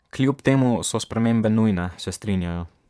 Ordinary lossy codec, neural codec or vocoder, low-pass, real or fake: none; vocoder, 22.05 kHz, 80 mel bands, Vocos; none; fake